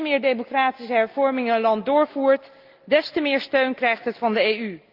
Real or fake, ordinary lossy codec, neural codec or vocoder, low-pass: real; Opus, 24 kbps; none; 5.4 kHz